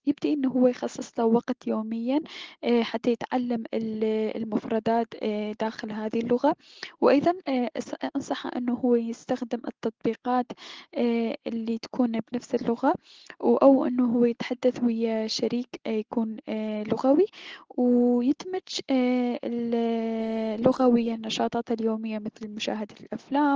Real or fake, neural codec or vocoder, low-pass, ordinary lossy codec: real; none; 7.2 kHz; Opus, 16 kbps